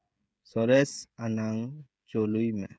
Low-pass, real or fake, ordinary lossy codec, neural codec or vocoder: none; fake; none; codec, 16 kHz, 16 kbps, FreqCodec, smaller model